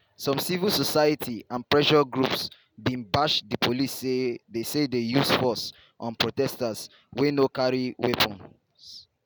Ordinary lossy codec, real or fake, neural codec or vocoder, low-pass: none; real; none; none